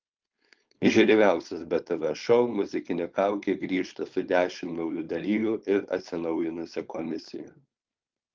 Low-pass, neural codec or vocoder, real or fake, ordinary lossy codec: 7.2 kHz; codec, 16 kHz, 4.8 kbps, FACodec; fake; Opus, 32 kbps